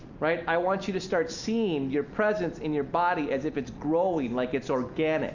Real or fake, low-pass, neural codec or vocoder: real; 7.2 kHz; none